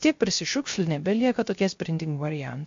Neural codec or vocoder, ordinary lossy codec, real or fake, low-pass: codec, 16 kHz, 0.3 kbps, FocalCodec; MP3, 48 kbps; fake; 7.2 kHz